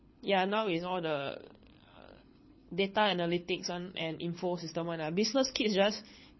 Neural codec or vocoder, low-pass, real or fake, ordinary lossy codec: codec, 24 kHz, 6 kbps, HILCodec; 7.2 kHz; fake; MP3, 24 kbps